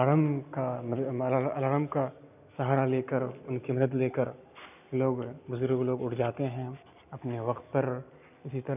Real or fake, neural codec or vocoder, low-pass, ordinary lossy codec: real; none; 3.6 kHz; none